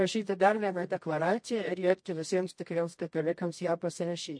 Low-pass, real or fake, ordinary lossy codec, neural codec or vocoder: 9.9 kHz; fake; MP3, 48 kbps; codec, 24 kHz, 0.9 kbps, WavTokenizer, medium music audio release